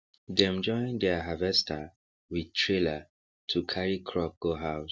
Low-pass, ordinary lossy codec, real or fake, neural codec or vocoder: none; none; real; none